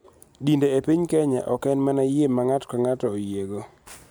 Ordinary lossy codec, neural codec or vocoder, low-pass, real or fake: none; none; none; real